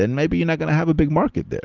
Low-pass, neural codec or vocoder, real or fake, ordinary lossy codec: 7.2 kHz; none; real; Opus, 32 kbps